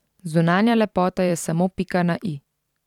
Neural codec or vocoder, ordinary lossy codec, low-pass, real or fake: vocoder, 44.1 kHz, 128 mel bands every 512 samples, BigVGAN v2; none; 19.8 kHz; fake